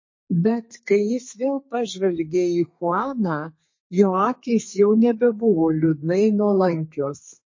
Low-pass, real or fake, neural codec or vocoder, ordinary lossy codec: 7.2 kHz; fake; codec, 32 kHz, 1.9 kbps, SNAC; MP3, 32 kbps